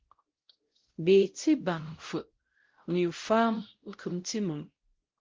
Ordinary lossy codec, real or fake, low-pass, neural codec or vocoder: Opus, 16 kbps; fake; 7.2 kHz; codec, 16 kHz, 0.5 kbps, X-Codec, WavLM features, trained on Multilingual LibriSpeech